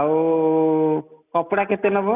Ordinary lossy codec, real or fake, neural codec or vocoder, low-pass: none; real; none; 3.6 kHz